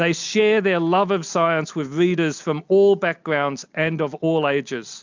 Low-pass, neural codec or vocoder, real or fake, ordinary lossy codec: 7.2 kHz; none; real; MP3, 64 kbps